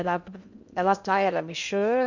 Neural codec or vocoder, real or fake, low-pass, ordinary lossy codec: codec, 16 kHz in and 24 kHz out, 0.6 kbps, FocalCodec, streaming, 2048 codes; fake; 7.2 kHz; none